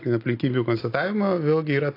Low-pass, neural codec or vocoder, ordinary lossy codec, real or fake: 5.4 kHz; none; AAC, 32 kbps; real